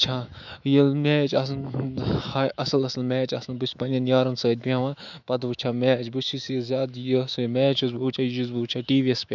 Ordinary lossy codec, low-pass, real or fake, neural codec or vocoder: none; 7.2 kHz; fake; autoencoder, 48 kHz, 128 numbers a frame, DAC-VAE, trained on Japanese speech